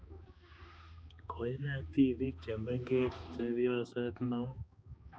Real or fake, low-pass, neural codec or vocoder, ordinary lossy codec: fake; none; codec, 16 kHz, 2 kbps, X-Codec, HuBERT features, trained on balanced general audio; none